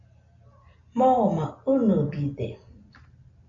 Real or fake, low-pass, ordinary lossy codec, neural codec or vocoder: real; 7.2 kHz; AAC, 48 kbps; none